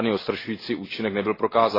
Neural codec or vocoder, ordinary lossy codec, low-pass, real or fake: none; AAC, 24 kbps; 5.4 kHz; real